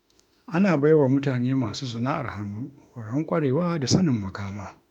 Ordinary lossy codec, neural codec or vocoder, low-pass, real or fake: none; autoencoder, 48 kHz, 32 numbers a frame, DAC-VAE, trained on Japanese speech; 19.8 kHz; fake